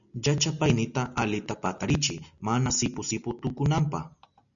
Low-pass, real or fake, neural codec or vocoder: 7.2 kHz; real; none